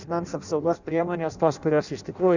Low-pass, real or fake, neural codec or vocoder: 7.2 kHz; fake; codec, 16 kHz in and 24 kHz out, 0.6 kbps, FireRedTTS-2 codec